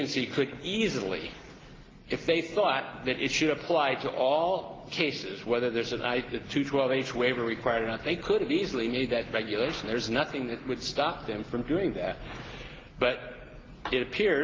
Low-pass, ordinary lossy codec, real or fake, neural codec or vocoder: 7.2 kHz; Opus, 16 kbps; real; none